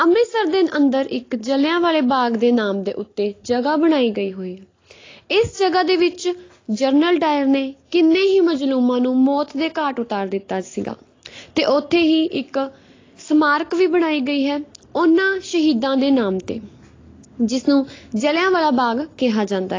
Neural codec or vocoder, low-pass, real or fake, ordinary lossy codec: none; 7.2 kHz; real; AAC, 32 kbps